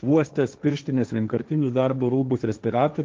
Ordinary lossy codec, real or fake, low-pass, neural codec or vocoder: Opus, 24 kbps; fake; 7.2 kHz; codec, 16 kHz, 1.1 kbps, Voila-Tokenizer